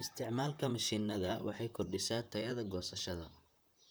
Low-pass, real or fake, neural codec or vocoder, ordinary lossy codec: none; fake; vocoder, 44.1 kHz, 128 mel bands, Pupu-Vocoder; none